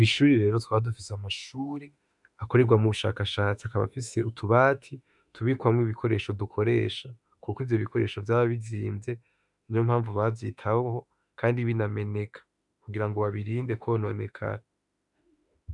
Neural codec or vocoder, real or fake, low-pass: autoencoder, 48 kHz, 32 numbers a frame, DAC-VAE, trained on Japanese speech; fake; 10.8 kHz